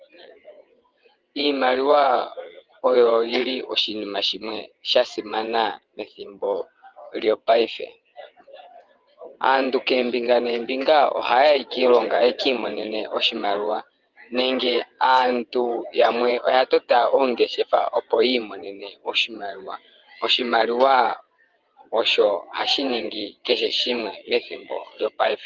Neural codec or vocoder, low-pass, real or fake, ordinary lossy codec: vocoder, 22.05 kHz, 80 mel bands, WaveNeXt; 7.2 kHz; fake; Opus, 32 kbps